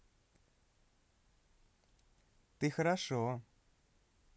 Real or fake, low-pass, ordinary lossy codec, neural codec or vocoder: real; none; none; none